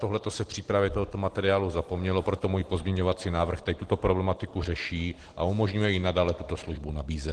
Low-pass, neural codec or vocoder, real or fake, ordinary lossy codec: 10.8 kHz; none; real; Opus, 16 kbps